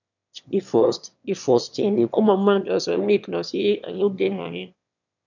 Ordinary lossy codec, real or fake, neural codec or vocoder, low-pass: none; fake; autoencoder, 22.05 kHz, a latent of 192 numbers a frame, VITS, trained on one speaker; 7.2 kHz